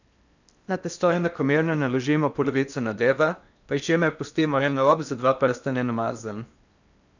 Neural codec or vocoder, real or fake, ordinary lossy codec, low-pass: codec, 16 kHz in and 24 kHz out, 0.8 kbps, FocalCodec, streaming, 65536 codes; fake; none; 7.2 kHz